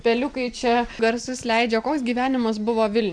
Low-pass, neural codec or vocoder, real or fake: 9.9 kHz; vocoder, 44.1 kHz, 128 mel bands every 256 samples, BigVGAN v2; fake